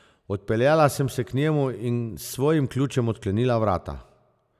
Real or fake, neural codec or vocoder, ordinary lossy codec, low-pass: real; none; none; 14.4 kHz